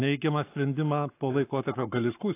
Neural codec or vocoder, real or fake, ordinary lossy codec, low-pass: codec, 44.1 kHz, 7.8 kbps, Pupu-Codec; fake; AAC, 24 kbps; 3.6 kHz